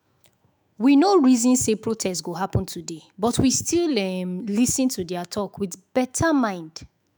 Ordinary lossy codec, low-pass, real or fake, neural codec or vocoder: none; none; fake; autoencoder, 48 kHz, 128 numbers a frame, DAC-VAE, trained on Japanese speech